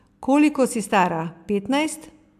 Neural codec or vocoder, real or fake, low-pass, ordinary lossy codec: none; real; 14.4 kHz; AAC, 96 kbps